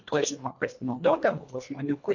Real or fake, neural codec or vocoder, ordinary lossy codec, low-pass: fake; codec, 24 kHz, 1.5 kbps, HILCodec; MP3, 48 kbps; 7.2 kHz